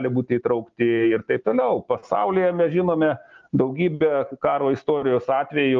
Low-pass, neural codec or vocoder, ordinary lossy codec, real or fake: 7.2 kHz; none; Opus, 24 kbps; real